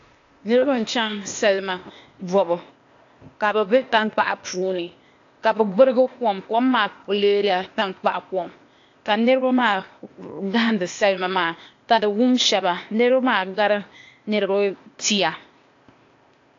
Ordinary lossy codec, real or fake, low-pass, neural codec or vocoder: AAC, 48 kbps; fake; 7.2 kHz; codec, 16 kHz, 0.8 kbps, ZipCodec